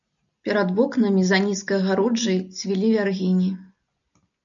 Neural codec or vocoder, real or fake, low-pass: none; real; 7.2 kHz